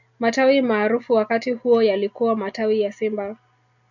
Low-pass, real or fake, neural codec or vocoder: 7.2 kHz; real; none